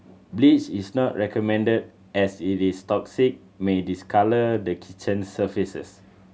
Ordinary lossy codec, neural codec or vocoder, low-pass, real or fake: none; none; none; real